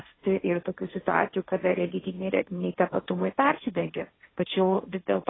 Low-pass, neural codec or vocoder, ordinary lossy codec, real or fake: 7.2 kHz; codec, 16 kHz, 1.1 kbps, Voila-Tokenizer; AAC, 16 kbps; fake